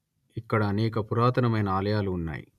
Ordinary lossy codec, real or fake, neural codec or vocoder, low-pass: none; real; none; 14.4 kHz